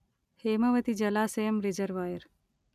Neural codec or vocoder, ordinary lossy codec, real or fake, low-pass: none; none; real; 14.4 kHz